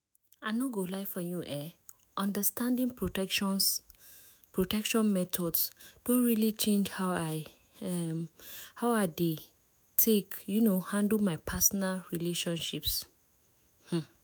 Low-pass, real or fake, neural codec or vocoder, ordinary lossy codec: none; fake; autoencoder, 48 kHz, 128 numbers a frame, DAC-VAE, trained on Japanese speech; none